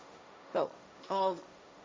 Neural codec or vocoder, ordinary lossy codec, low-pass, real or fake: codec, 16 kHz, 1.1 kbps, Voila-Tokenizer; none; none; fake